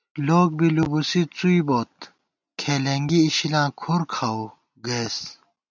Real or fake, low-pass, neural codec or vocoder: real; 7.2 kHz; none